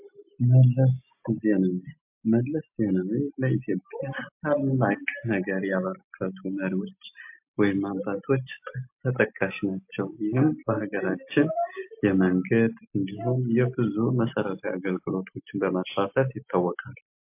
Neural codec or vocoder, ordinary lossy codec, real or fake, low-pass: none; MP3, 32 kbps; real; 3.6 kHz